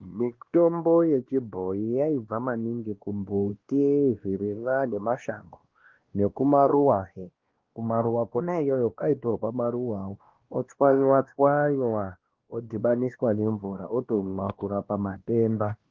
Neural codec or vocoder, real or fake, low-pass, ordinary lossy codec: codec, 16 kHz, 1 kbps, X-Codec, WavLM features, trained on Multilingual LibriSpeech; fake; 7.2 kHz; Opus, 16 kbps